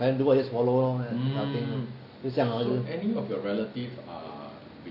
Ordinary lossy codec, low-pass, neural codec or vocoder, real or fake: none; 5.4 kHz; none; real